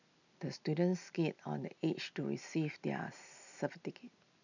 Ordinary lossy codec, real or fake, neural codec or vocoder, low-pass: none; real; none; 7.2 kHz